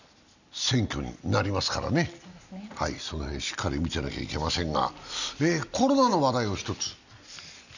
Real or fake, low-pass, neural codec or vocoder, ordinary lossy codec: real; 7.2 kHz; none; none